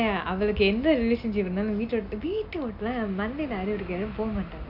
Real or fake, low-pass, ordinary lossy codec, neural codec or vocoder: real; 5.4 kHz; none; none